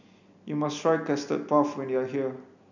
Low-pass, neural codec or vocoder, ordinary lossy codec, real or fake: 7.2 kHz; none; none; real